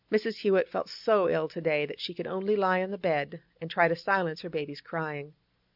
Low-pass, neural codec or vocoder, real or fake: 5.4 kHz; none; real